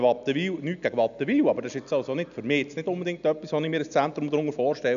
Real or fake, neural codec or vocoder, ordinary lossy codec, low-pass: real; none; none; 7.2 kHz